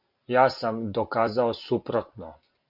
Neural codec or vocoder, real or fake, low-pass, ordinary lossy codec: none; real; 5.4 kHz; MP3, 48 kbps